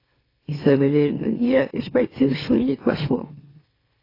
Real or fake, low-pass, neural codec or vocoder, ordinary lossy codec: fake; 5.4 kHz; autoencoder, 44.1 kHz, a latent of 192 numbers a frame, MeloTTS; AAC, 24 kbps